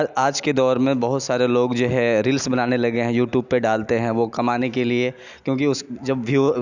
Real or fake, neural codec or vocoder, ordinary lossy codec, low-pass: real; none; none; 7.2 kHz